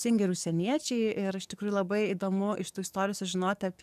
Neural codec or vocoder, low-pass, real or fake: codec, 44.1 kHz, 7.8 kbps, DAC; 14.4 kHz; fake